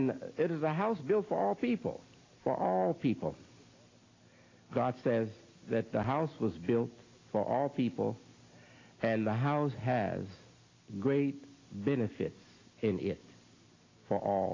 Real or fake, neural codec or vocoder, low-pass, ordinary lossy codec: real; none; 7.2 kHz; AAC, 32 kbps